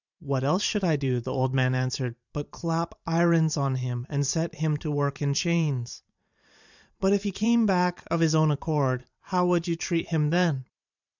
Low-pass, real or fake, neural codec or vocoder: 7.2 kHz; fake; vocoder, 44.1 kHz, 128 mel bands every 512 samples, BigVGAN v2